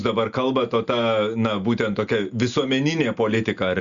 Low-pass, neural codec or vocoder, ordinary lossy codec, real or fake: 7.2 kHz; none; Opus, 64 kbps; real